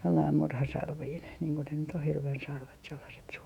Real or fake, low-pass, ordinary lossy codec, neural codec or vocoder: fake; 19.8 kHz; none; autoencoder, 48 kHz, 128 numbers a frame, DAC-VAE, trained on Japanese speech